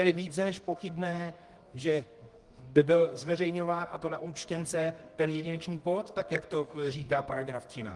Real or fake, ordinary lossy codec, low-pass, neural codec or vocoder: fake; Opus, 24 kbps; 10.8 kHz; codec, 24 kHz, 0.9 kbps, WavTokenizer, medium music audio release